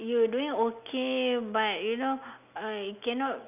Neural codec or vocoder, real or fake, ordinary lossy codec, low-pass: none; real; none; 3.6 kHz